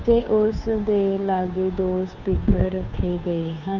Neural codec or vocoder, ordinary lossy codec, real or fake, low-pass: codec, 16 kHz, 2 kbps, FunCodec, trained on Chinese and English, 25 frames a second; none; fake; 7.2 kHz